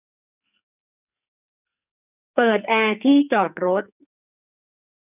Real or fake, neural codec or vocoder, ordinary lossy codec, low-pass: fake; codec, 44.1 kHz, 2.6 kbps, SNAC; none; 3.6 kHz